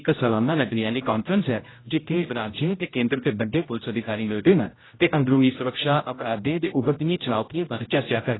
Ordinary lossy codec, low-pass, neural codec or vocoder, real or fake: AAC, 16 kbps; 7.2 kHz; codec, 16 kHz, 0.5 kbps, X-Codec, HuBERT features, trained on general audio; fake